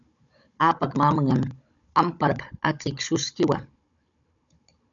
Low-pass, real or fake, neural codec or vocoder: 7.2 kHz; fake; codec, 16 kHz, 16 kbps, FunCodec, trained on Chinese and English, 50 frames a second